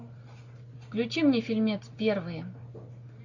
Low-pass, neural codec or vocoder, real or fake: 7.2 kHz; none; real